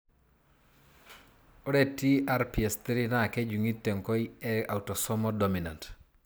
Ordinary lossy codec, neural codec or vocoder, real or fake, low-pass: none; none; real; none